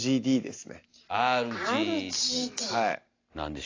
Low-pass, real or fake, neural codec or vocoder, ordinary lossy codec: 7.2 kHz; real; none; AAC, 32 kbps